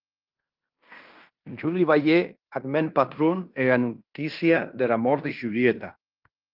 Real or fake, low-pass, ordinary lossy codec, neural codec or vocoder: fake; 5.4 kHz; Opus, 24 kbps; codec, 16 kHz in and 24 kHz out, 0.9 kbps, LongCat-Audio-Codec, fine tuned four codebook decoder